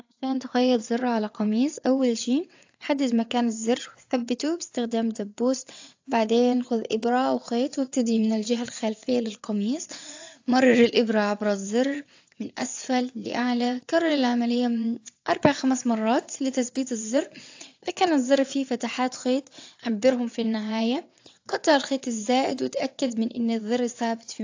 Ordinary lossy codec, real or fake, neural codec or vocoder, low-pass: AAC, 48 kbps; fake; vocoder, 22.05 kHz, 80 mel bands, WaveNeXt; 7.2 kHz